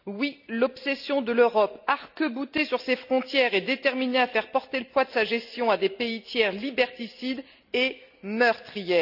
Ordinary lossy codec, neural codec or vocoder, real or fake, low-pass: none; none; real; 5.4 kHz